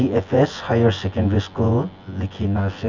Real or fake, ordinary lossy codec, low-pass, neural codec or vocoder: fake; none; 7.2 kHz; vocoder, 24 kHz, 100 mel bands, Vocos